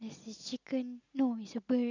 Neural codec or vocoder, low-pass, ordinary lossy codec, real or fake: none; 7.2 kHz; none; real